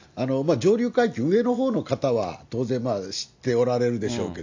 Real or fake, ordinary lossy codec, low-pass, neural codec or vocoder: real; AAC, 48 kbps; 7.2 kHz; none